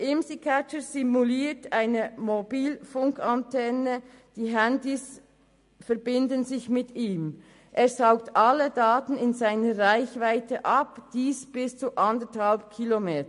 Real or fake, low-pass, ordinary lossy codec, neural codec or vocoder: real; 10.8 kHz; MP3, 48 kbps; none